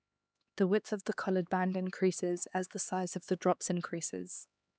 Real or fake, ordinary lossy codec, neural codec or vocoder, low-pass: fake; none; codec, 16 kHz, 2 kbps, X-Codec, HuBERT features, trained on LibriSpeech; none